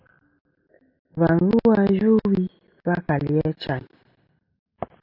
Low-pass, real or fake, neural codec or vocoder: 5.4 kHz; real; none